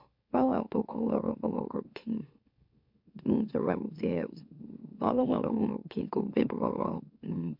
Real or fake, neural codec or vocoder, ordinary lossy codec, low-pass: fake; autoencoder, 44.1 kHz, a latent of 192 numbers a frame, MeloTTS; MP3, 48 kbps; 5.4 kHz